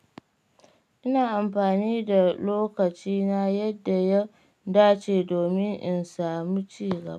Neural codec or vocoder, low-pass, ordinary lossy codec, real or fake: none; 14.4 kHz; none; real